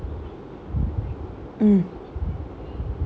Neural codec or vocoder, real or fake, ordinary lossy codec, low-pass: none; real; none; none